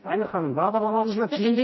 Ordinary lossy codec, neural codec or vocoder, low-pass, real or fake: MP3, 24 kbps; codec, 16 kHz, 1 kbps, FreqCodec, smaller model; 7.2 kHz; fake